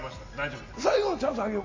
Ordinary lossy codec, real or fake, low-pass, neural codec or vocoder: MP3, 32 kbps; real; 7.2 kHz; none